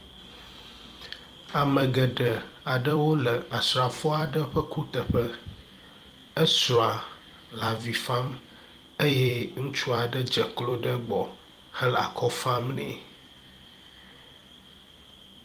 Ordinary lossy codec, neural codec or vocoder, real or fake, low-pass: Opus, 32 kbps; vocoder, 44.1 kHz, 128 mel bands every 256 samples, BigVGAN v2; fake; 14.4 kHz